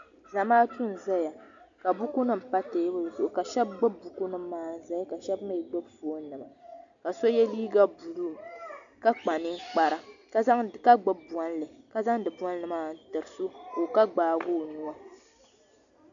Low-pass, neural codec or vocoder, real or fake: 7.2 kHz; none; real